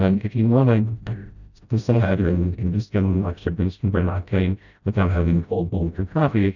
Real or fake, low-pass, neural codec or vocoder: fake; 7.2 kHz; codec, 16 kHz, 0.5 kbps, FreqCodec, smaller model